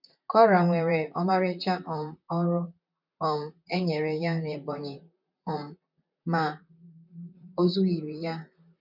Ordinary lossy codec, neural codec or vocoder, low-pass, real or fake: none; vocoder, 44.1 kHz, 128 mel bands, Pupu-Vocoder; 5.4 kHz; fake